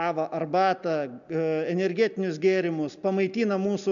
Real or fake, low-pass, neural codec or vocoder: real; 7.2 kHz; none